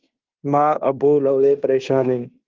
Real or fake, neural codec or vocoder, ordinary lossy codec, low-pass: fake; codec, 16 kHz in and 24 kHz out, 0.9 kbps, LongCat-Audio-Codec, fine tuned four codebook decoder; Opus, 32 kbps; 7.2 kHz